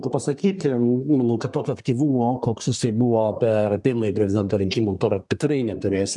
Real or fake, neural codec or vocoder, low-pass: fake; codec, 24 kHz, 1 kbps, SNAC; 10.8 kHz